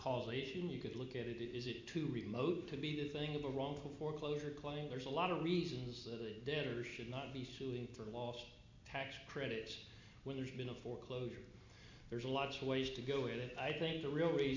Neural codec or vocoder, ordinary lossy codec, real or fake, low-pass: none; MP3, 64 kbps; real; 7.2 kHz